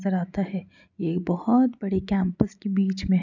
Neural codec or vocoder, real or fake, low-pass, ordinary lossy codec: none; real; 7.2 kHz; none